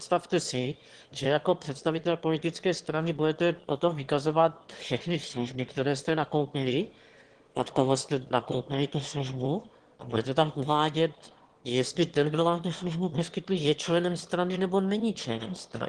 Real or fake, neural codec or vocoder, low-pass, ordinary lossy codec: fake; autoencoder, 22.05 kHz, a latent of 192 numbers a frame, VITS, trained on one speaker; 9.9 kHz; Opus, 16 kbps